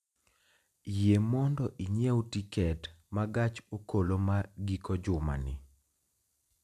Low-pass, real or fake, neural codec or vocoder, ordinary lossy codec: 14.4 kHz; real; none; none